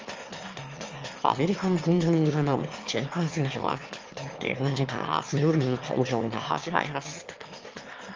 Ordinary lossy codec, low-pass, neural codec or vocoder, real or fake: Opus, 32 kbps; 7.2 kHz; autoencoder, 22.05 kHz, a latent of 192 numbers a frame, VITS, trained on one speaker; fake